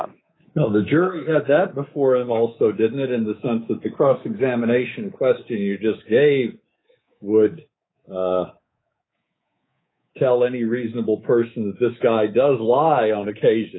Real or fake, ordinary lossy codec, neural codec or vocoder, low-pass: fake; AAC, 16 kbps; codec, 24 kHz, 3.1 kbps, DualCodec; 7.2 kHz